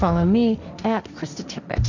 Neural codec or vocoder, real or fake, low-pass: codec, 16 kHz, 1.1 kbps, Voila-Tokenizer; fake; 7.2 kHz